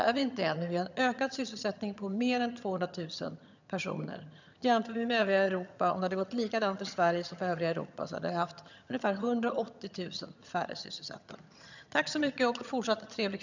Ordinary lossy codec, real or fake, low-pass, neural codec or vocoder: none; fake; 7.2 kHz; vocoder, 22.05 kHz, 80 mel bands, HiFi-GAN